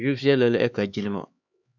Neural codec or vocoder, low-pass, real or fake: codec, 16 kHz, 4 kbps, X-Codec, HuBERT features, trained on LibriSpeech; 7.2 kHz; fake